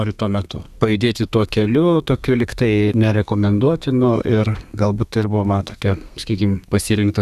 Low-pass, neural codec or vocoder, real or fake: 14.4 kHz; codec, 32 kHz, 1.9 kbps, SNAC; fake